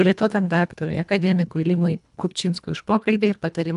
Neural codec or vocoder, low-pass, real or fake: codec, 24 kHz, 1.5 kbps, HILCodec; 10.8 kHz; fake